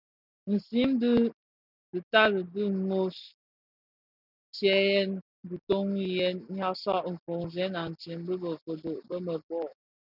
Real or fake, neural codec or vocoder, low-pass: real; none; 5.4 kHz